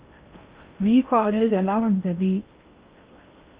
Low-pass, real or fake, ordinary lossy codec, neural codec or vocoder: 3.6 kHz; fake; Opus, 32 kbps; codec, 16 kHz in and 24 kHz out, 0.6 kbps, FocalCodec, streaming, 2048 codes